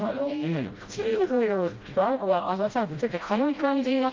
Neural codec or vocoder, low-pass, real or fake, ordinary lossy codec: codec, 16 kHz, 0.5 kbps, FreqCodec, smaller model; 7.2 kHz; fake; Opus, 24 kbps